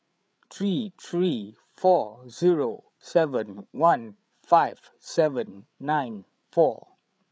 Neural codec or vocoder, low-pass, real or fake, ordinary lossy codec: codec, 16 kHz, 8 kbps, FreqCodec, larger model; none; fake; none